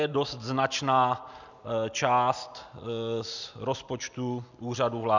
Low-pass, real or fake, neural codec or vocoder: 7.2 kHz; real; none